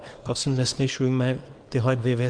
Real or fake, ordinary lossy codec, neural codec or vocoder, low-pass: fake; Opus, 32 kbps; codec, 24 kHz, 0.9 kbps, WavTokenizer, small release; 9.9 kHz